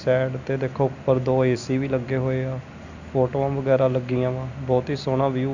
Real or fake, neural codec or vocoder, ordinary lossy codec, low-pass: real; none; none; 7.2 kHz